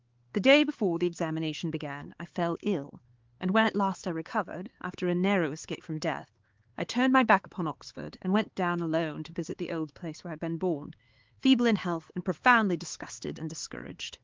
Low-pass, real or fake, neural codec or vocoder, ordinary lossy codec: 7.2 kHz; fake; codec, 16 kHz, 4 kbps, X-Codec, HuBERT features, trained on LibriSpeech; Opus, 16 kbps